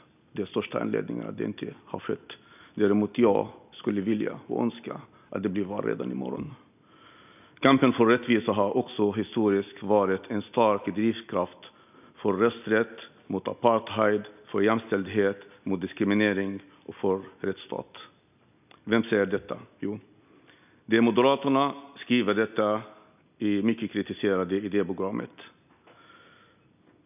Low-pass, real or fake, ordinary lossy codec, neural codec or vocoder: 3.6 kHz; real; none; none